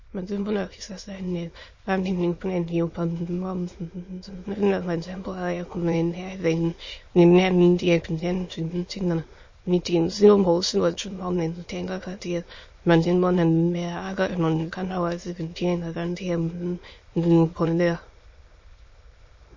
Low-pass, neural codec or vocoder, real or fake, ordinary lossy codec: 7.2 kHz; autoencoder, 22.05 kHz, a latent of 192 numbers a frame, VITS, trained on many speakers; fake; MP3, 32 kbps